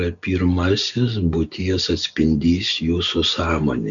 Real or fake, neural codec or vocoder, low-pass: real; none; 7.2 kHz